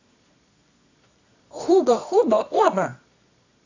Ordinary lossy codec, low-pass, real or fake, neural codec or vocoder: AAC, 48 kbps; 7.2 kHz; fake; codec, 24 kHz, 0.9 kbps, WavTokenizer, medium music audio release